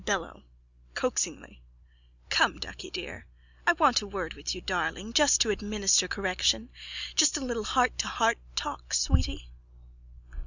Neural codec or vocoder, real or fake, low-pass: none; real; 7.2 kHz